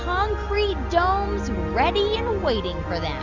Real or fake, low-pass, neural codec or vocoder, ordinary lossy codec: real; 7.2 kHz; none; Opus, 64 kbps